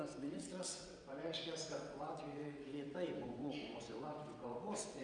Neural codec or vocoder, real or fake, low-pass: vocoder, 22.05 kHz, 80 mel bands, WaveNeXt; fake; 9.9 kHz